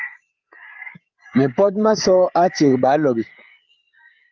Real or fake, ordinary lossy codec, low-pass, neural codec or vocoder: fake; Opus, 32 kbps; 7.2 kHz; codec, 16 kHz, 8 kbps, FreqCodec, larger model